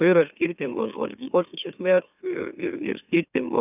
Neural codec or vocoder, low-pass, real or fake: autoencoder, 44.1 kHz, a latent of 192 numbers a frame, MeloTTS; 3.6 kHz; fake